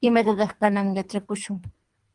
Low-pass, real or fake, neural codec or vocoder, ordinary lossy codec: 10.8 kHz; fake; codec, 24 kHz, 3 kbps, HILCodec; Opus, 32 kbps